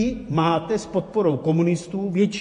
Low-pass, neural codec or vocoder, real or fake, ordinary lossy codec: 14.4 kHz; vocoder, 48 kHz, 128 mel bands, Vocos; fake; MP3, 48 kbps